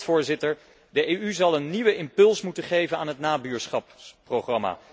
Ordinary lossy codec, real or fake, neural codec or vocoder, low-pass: none; real; none; none